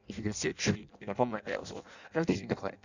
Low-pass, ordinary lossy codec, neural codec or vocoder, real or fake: 7.2 kHz; none; codec, 16 kHz in and 24 kHz out, 0.6 kbps, FireRedTTS-2 codec; fake